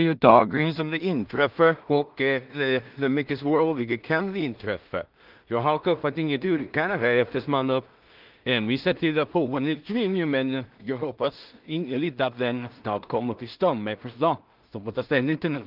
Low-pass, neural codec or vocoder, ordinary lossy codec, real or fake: 5.4 kHz; codec, 16 kHz in and 24 kHz out, 0.4 kbps, LongCat-Audio-Codec, two codebook decoder; Opus, 32 kbps; fake